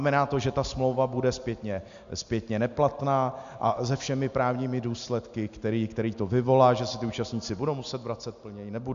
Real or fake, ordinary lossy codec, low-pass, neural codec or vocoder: real; MP3, 64 kbps; 7.2 kHz; none